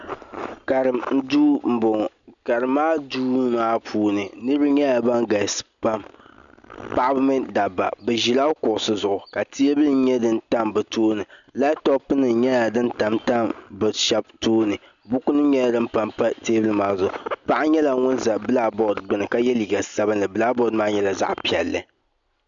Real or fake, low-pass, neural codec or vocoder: real; 7.2 kHz; none